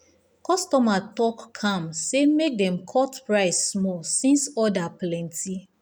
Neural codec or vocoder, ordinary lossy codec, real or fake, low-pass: none; none; real; none